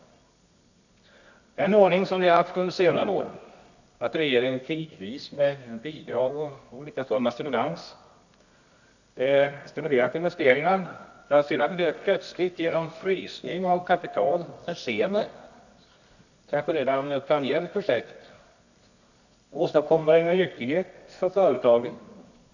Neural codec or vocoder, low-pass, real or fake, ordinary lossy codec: codec, 24 kHz, 0.9 kbps, WavTokenizer, medium music audio release; 7.2 kHz; fake; none